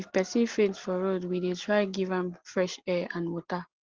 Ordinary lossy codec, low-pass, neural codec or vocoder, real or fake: Opus, 16 kbps; 7.2 kHz; none; real